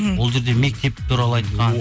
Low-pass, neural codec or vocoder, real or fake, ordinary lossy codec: none; none; real; none